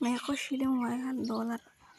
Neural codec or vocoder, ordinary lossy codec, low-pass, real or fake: none; none; 14.4 kHz; real